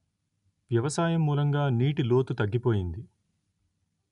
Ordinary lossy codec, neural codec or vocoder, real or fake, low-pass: none; none; real; 10.8 kHz